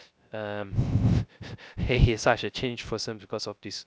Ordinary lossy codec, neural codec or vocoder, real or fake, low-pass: none; codec, 16 kHz, 0.3 kbps, FocalCodec; fake; none